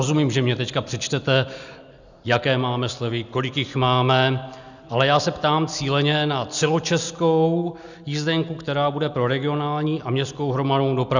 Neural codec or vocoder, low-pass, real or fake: none; 7.2 kHz; real